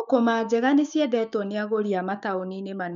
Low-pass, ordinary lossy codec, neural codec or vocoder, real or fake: 7.2 kHz; none; codec, 16 kHz, 6 kbps, DAC; fake